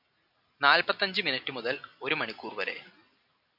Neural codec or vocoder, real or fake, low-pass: none; real; 5.4 kHz